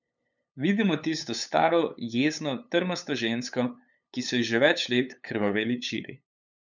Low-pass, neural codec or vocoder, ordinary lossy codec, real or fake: 7.2 kHz; codec, 16 kHz, 8 kbps, FunCodec, trained on LibriTTS, 25 frames a second; none; fake